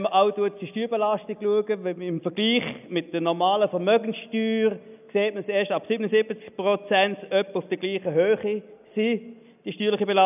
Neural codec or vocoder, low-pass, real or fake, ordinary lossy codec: none; 3.6 kHz; real; none